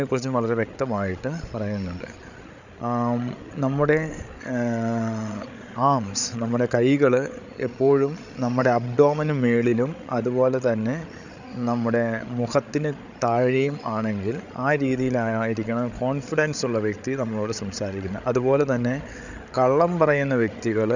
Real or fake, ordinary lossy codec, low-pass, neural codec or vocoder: fake; none; 7.2 kHz; codec, 16 kHz, 8 kbps, FreqCodec, larger model